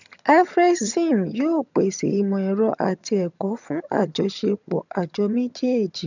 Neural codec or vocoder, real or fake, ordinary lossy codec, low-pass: vocoder, 22.05 kHz, 80 mel bands, HiFi-GAN; fake; none; 7.2 kHz